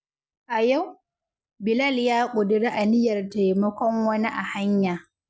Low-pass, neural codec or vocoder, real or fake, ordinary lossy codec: none; none; real; none